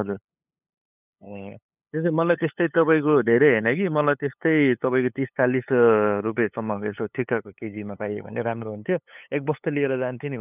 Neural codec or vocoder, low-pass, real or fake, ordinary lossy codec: codec, 16 kHz, 8 kbps, FunCodec, trained on LibriTTS, 25 frames a second; 3.6 kHz; fake; none